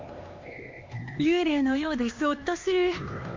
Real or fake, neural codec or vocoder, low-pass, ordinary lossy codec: fake; codec, 16 kHz, 2 kbps, X-Codec, HuBERT features, trained on LibriSpeech; 7.2 kHz; MP3, 48 kbps